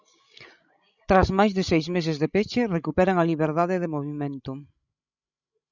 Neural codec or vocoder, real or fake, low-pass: codec, 16 kHz, 16 kbps, FreqCodec, larger model; fake; 7.2 kHz